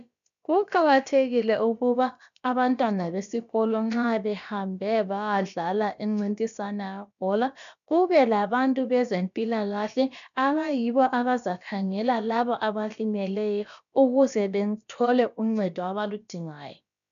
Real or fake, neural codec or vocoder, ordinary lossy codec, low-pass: fake; codec, 16 kHz, about 1 kbps, DyCAST, with the encoder's durations; MP3, 96 kbps; 7.2 kHz